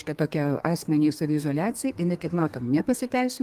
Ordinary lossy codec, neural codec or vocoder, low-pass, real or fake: Opus, 24 kbps; codec, 32 kHz, 1.9 kbps, SNAC; 14.4 kHz; fake